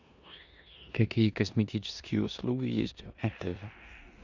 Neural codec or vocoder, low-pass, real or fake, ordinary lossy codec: codec, 16 kHz in and 24 kHz out, 0.9 kbps, LongCat-Audio-Codec, four codebook decoder; 7.2 kHz; fake; Opus, 64 kbps